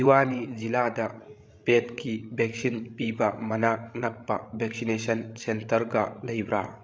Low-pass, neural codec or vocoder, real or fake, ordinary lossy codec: none; codec, 16 kHz, 16 kbps, FreqCodec, larger model; fake; none